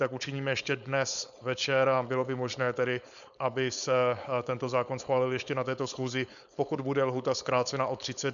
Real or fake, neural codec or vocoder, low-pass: fake; codec, 16 kHz, 4.8 kbps, FACodec; 7.2 kHz